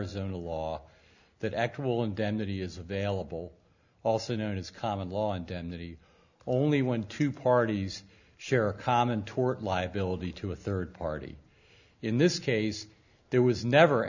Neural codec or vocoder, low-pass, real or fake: none; 7.2 kHz; real